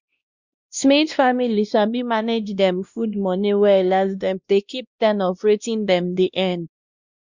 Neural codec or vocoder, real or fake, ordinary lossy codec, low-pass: codec, 16 kHz, 1 kbps, X-Codec, WavLM features, trained on Multilingual LibriSpeech; fake; Opus, 64 kbps; 7.2 kHz